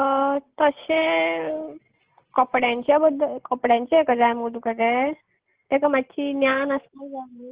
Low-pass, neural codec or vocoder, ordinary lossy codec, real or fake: 3.6 kHz; none; Opus, 16 kbps; real